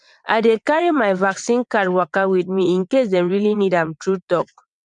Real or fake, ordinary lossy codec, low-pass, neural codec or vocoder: fake; none; 9.9 kHz; vocoder, 22.05 kHz, 80 mel bands, WaveNeXt